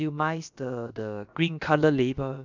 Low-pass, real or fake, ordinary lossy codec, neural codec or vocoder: 7.2 kHz; fake; none; codec, 16 kHz, about 1 kbps, DyCAST, with the encoder's durations